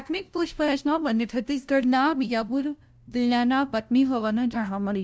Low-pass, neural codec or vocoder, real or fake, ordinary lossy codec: none; codec, 16 kHz, 0.5 kbps, FunCodec, trained on LibriTTS, 25 frames a second; fake; none